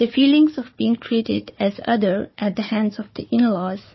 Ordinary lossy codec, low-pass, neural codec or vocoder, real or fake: MP3, 24 kbps; 7.2 kHz; codec, 16 kHz, 4 kbps, FunCodec, trained on Chinese and English, 50 frames a second; fake